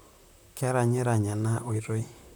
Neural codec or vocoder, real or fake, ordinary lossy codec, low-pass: vocoder, 44.1 kHz, 128 mel bands, Pupu-Vocoder; fake; none; none